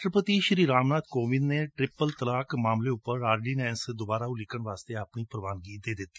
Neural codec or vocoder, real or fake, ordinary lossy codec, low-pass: none; real; none; none